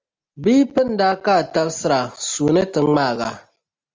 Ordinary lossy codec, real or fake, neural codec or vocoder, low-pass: Opus, 32 kbps; real; none; 7.2 kHz